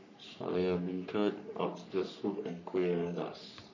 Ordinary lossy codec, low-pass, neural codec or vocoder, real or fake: none; 7.2 kHz; codec, 44.1 kHz, 3.4 kbps, Pupu-Codec; fake